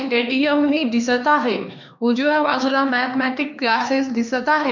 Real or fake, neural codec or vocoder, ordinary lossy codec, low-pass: fake; codec, 16 kHz, 2 kbps, X-Codec, HuBERT features, trained on LibriSpeech; none; 7.2 kHz